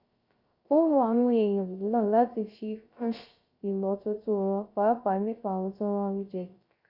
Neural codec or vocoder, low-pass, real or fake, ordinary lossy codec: codec, 16 kHz, 0.3 kbps, FocalCodec; 5.4 kHz; fake; Opus, 64 kbps